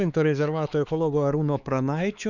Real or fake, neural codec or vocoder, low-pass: fake; codec, 16 kHz, 4 kbps, X-Codec, HuBERT features, trained on LibriSpeech; 7.2 kHz